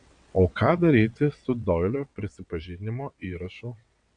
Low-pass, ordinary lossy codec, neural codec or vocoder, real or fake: 9.9 kHz; MP3, 96 kbps; vocoder, 22.05 kHz, 80 mel bands, WaveNeXt; fake